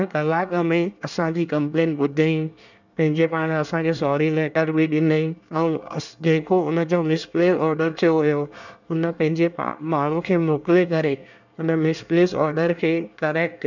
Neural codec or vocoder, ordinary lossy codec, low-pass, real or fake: codec, 24 kHz, 1 kbps, SNAC; none; 7.2 kHz; fake